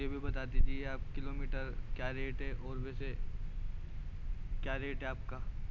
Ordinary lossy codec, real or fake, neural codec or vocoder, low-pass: none; real; none; 7.2 kHz